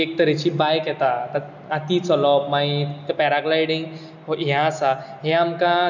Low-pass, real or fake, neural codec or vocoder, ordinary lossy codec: 7.2 kHz; real; none; none